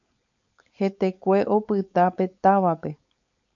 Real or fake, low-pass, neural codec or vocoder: fake; 7.2 kHz; codec, 16 kHz, 4.8 kbps, FACodec